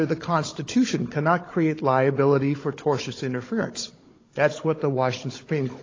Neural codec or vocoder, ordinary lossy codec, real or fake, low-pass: codec, 16 kHz, 16 kbps, FunCodec, trained on Chinese and English, 50 frames a second; AAC, 32 kbps; fake; 7.2 kHz